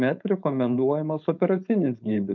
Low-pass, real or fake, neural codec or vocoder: 7.2 kHz; fake; codec, 16 kHz, 4.8 kbps, FACodec